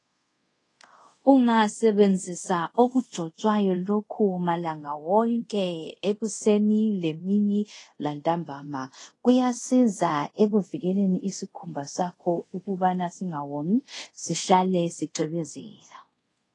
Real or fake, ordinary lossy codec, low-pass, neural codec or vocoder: fake; AAC, 32 kbps; 10.8 kHz; codec, 24 kHz, 0.5 kbps, DualCodec